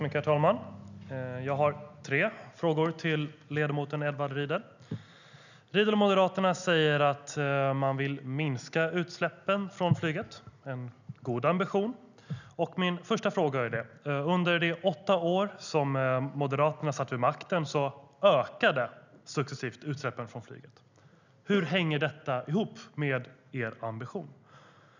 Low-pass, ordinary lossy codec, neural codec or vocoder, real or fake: 7.2 kHz; none; none; real